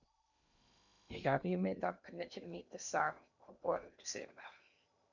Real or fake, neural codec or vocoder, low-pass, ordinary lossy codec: fake; codec, 16 kHz in and 24 kHz out, 0.8 kbps, FocalCodec, streaming, 65536 codes; 7.2 kHz; none